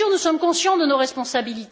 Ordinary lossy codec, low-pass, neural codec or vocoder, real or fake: none; none; none; real